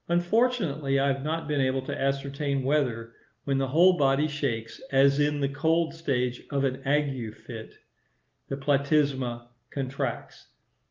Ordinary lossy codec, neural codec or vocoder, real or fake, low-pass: Opus, 32 kbps; none; real; 7.2 kHz